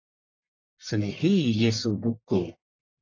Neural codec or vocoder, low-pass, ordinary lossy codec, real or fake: codec, 44.1 kHz, 1.7 kbps, Pupu-Codec; 7.2 kHz; AAC, 48 kbps; fake